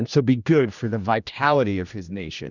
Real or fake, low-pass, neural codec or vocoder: fake; 7.2 kHz; codec, 16 kHz, 1 kbps, X-Codec, HuBERT features, trained on general audio